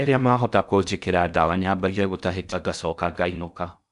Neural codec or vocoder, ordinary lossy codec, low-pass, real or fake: codec, 16 kHz in and 24 kHz out, 0.6 kbps, FocalCodec, streaming, 4096 codes; none; 10.8 kHz; fake